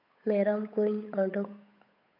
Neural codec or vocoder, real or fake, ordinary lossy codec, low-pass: codec, 16 kHz, 8 kbps, FunCodec, trained on Chinese and English, 25 frames a second; fake; none; 5.4 kHz